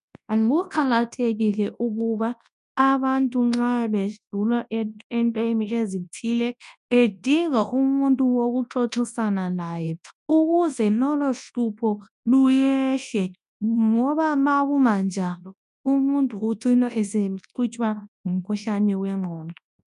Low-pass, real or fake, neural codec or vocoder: 10.8 kHz; fake; codec, 24 kHz, 0.9 kbps, WavTokenizer, large speech release